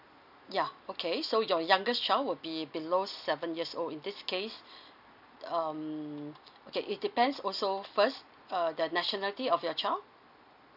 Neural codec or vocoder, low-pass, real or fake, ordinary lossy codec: none; 5.4 kHz; real; none